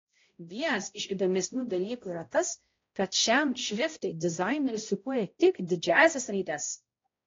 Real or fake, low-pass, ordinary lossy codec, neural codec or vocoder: fake; 7.2 kHz; AAC, 32 kbps; codec, 16 kHz, 0.5 kbps, X-Codec, HuBERT features, trained on balanced general audio